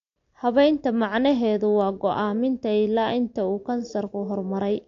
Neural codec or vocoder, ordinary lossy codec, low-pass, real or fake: none; AAC, 48 kbps; 7.2 kHz; real